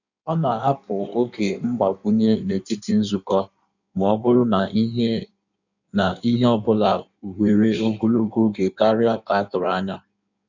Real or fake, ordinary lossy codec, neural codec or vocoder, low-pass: fake; none; codec, 16 kHz in and 24 kHz out, 1.1 kbps, FireRedTTS-2 codec; 7.2 kHz